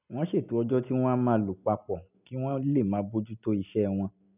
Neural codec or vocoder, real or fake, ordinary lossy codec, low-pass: none; real; none; 3.6 kHz